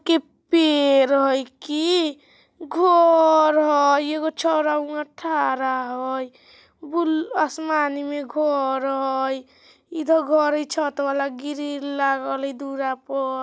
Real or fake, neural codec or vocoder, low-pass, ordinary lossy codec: real; none; none; none